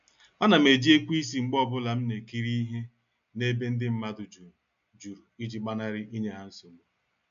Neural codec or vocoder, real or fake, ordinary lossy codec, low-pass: none; real; AAC, 96 kbps; 7.2 kHz